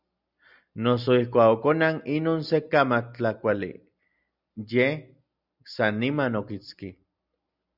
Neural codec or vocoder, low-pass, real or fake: none; 5.4 kHz; real